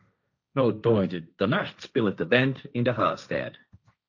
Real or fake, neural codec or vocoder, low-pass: fake; codec, 16 kHz, 1.1 kbps, Voila-Tokenizer; 7.2 kHz